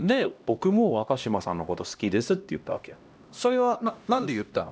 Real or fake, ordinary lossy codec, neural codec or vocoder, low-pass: fake; none; codec, 16 kHz, 1 kbps, X-Codec, HuBERT features, trained on LibriSpeech; none